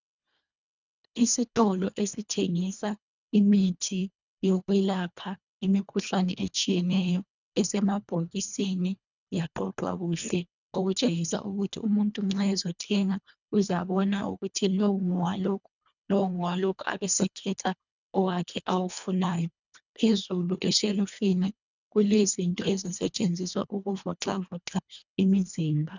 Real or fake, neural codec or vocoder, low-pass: fake; codec, 24 kHz, 1.5 kbps, HILCodec; 7.2 kHz